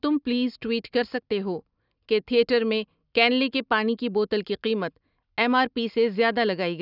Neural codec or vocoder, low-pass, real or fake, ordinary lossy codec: none; 5.4 kHz; real; none